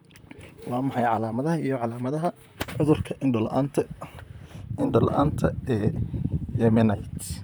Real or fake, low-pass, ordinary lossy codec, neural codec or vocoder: fake; none; none; vocoder, 44.1 kHz, 128 mel bands, Pupu-Vocoder